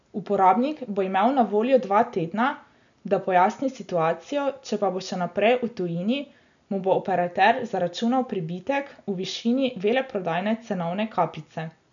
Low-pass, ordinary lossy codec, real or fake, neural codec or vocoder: 7.2 kHz; none; real; none